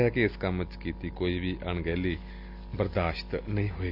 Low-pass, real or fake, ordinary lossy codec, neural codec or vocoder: 5.4 kHz; real; none; none